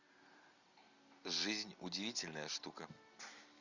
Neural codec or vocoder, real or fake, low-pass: none; real; 7.2 kHz